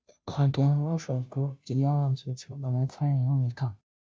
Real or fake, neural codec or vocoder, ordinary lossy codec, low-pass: fake; codec, 16 kHz, 0.5 kbps, FunCodec, trained on Chinese and English, 25 frames a second; none; none